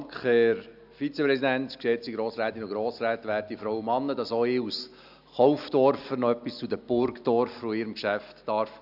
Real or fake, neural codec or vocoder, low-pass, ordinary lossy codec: real; none; 5.4 kHz; none